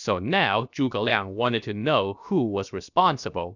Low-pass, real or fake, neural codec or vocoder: 7.2 kHz; fake; codec, 16 kHz, 0.7 kbps, FocalCodec